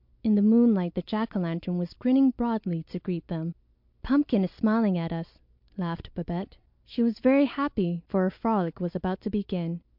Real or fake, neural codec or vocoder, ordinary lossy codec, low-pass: real; none; MP3, 48 kbps; 5.4 kHz